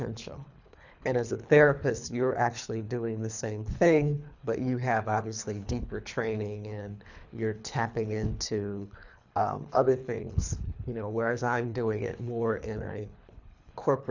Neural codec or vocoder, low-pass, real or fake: codec, 24 kHz, 3 kbps, HILCodec; 7.2 kHz; fake